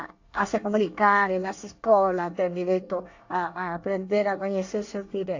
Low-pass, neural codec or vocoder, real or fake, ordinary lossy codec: 7.2 kHz; codec, 24 kHz, 1 kbps, SNAC; fake; AAC, 48 kbps